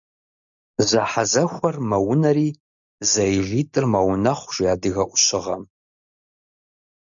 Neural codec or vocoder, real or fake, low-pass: none; real; 7.2 kHz